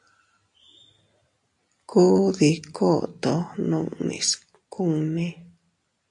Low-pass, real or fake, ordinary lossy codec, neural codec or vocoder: 10.8 kHz; real; AAC, 64 kbps; none